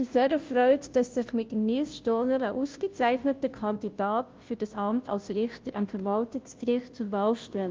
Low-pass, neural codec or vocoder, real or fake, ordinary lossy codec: 7.2 kHz; codec, 16 kHz, 0.5 kbps, FunCodec, trained on Chinese and English, 25 frames a second; fake; Opus, 24 kbps